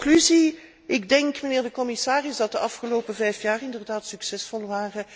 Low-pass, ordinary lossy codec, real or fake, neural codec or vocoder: none; none; real; none